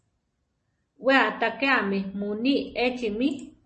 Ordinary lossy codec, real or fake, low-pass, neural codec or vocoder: MP3, 32 kbps; real; 9.9 kHz; none